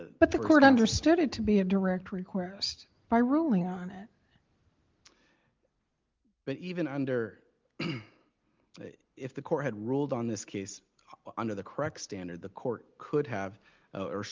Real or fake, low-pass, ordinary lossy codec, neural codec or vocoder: real; 7.2 kHz; Opus, 32 kbps; none